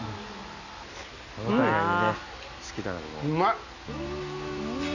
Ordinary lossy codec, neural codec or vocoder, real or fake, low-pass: none; none; real; 7.2 kHz